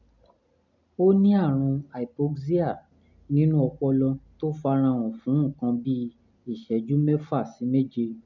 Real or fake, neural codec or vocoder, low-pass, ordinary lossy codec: real; none; 7.2 kHz; none